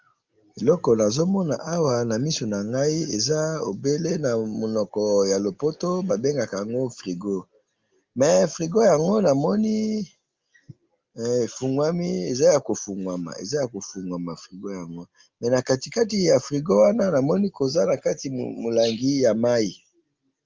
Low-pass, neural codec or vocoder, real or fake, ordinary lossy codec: 7.2 kHz; none; real; Opus, 32 kbps